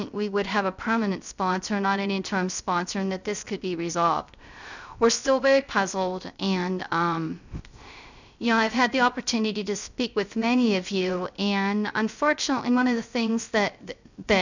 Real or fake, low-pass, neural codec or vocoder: fake; 7.2 kHz; codec, 16 kHz, 0.3 kbps, FocalCodec